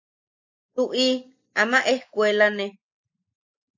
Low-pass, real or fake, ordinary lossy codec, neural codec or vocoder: 7.2 kHz; real; AAC, 48 kbps; none